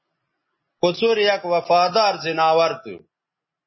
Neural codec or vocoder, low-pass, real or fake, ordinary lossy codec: none; 7.2 kHz; real; MP3, 24 kbps